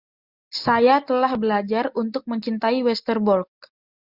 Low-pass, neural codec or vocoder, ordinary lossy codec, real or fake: 5.4 kHz; none; Opus, 64 kbps; real